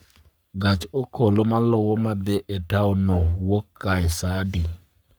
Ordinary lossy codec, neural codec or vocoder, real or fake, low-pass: none; codec, 44.1 kHz, 3.4 kbps, Pupu-Codec; fake; none